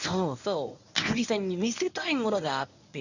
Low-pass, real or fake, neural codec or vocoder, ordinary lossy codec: 7.2 kHz; fake; codec, 24 kHz, 0.9 kbps, WavTokenizer, medium speech release version 1; none